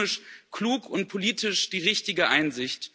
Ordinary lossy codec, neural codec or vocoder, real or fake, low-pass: none; none; real; none